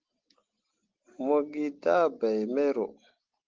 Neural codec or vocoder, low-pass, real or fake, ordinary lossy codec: none; 7.2 kHz; real; Opus, 16 kbps